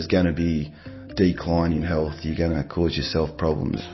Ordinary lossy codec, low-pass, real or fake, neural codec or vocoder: MP3, 24 kbps; 7.2 kHz; real; none